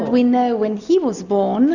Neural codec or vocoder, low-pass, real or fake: none; 7.2 kHz; real